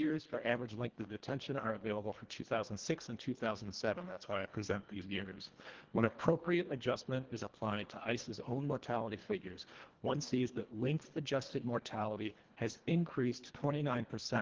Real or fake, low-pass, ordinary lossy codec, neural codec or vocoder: fake; 7.2 kHz; Opus, 16 kbps; codec, 24 kHz, 1.5 kbps, HILCodec